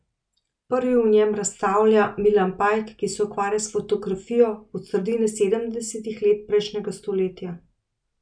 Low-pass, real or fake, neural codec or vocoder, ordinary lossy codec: 9.9 kHz; real; none; none